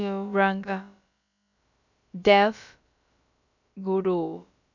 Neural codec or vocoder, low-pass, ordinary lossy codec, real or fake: codec, 16 kHz, about 1 kbps, DyCAST, with the encoder's durations; 7.2 kHz; none; fake